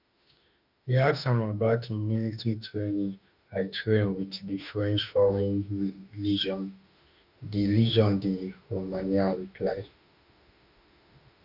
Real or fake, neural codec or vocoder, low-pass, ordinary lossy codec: fake; autoencoder, 48 kHz, 32 numbers a frame, DAC-VAE, trained on Japanese speech; 5.4 kHz; none